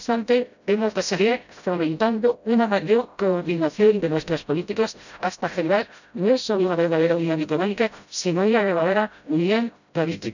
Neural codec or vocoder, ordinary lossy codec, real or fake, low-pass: codec, 16 kHz, 0.5 kbps, FreqCodec, smaller model; none; fake; 7.2 kHz